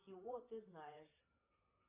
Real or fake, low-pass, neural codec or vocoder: fake; 3.6 kHz; vocoder, 44.1 kHz, 128 mel bands, Pupu-Vocoder